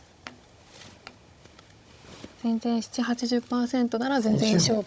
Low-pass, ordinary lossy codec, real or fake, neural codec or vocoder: none; none; fake; codec, 16 kHz, 16 kbps, FunCodec, trained on Chinese and English, 50 frames a second